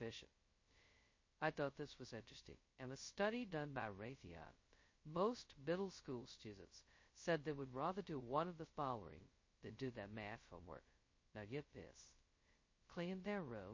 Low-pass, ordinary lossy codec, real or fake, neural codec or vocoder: 7.2 kHz; MP3, 32 kbps; fake; codec, 16 kHz, 0.2 kbps, FocalCodec